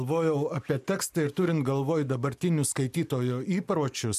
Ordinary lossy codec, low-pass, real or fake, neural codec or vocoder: MP3, 96 kbps; 14.4 kHz; real; none